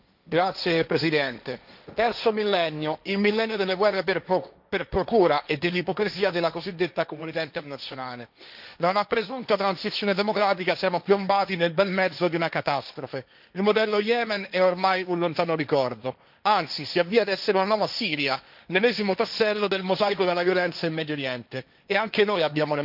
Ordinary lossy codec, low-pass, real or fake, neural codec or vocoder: none; 5.4 kHz; fake; codec, 16 kHz, 1.1 kbps, Voila-Tokenizer